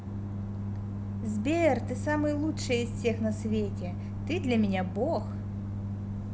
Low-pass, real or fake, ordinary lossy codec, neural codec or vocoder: none; real; none; none